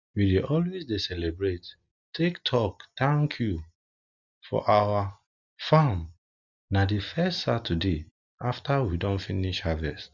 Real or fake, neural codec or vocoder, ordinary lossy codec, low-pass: real; none; none; 7.2 kHz